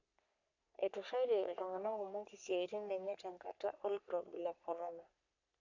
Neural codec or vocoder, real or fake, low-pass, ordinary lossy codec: codec, 44.1 kHz, 3.4 kbps, Pupu-Codec; fake; 7.2 kHz; none